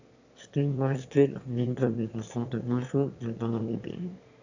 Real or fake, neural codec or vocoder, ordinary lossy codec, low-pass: fake; autoencoder, 22.05 kHz, a latent of 192 numbers a frame, VITS, trained on one speaker; AAC, 48 kbps; 7.2 kHz